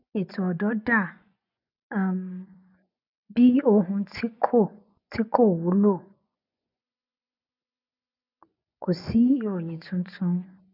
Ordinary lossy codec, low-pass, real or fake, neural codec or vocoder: none; 5.4 kHz; fake; vocoder, 24 kHz, 100 mel bands, Vocos